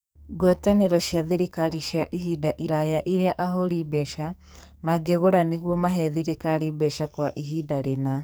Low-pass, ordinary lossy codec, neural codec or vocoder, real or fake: none; none; codec, 44.1 kHz, 2.6 kbps, SNAC; fake